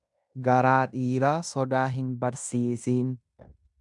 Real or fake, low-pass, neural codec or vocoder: fake; 10.8 kHz; codec, 16 kHz in and 24 kHz out, 0.9 kbps, LongCat-Audio-Codec, fine tuned four codebook decoder